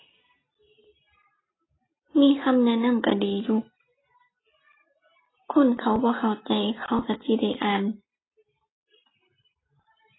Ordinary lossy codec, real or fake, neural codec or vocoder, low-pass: AAC, 16 kbps; real; none; 7.2 kHz